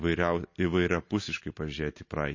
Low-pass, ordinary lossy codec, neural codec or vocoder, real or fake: 7.2 kHz; MP3, 32 kbps; none; real